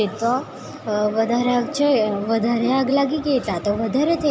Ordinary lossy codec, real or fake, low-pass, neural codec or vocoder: none; real; none; none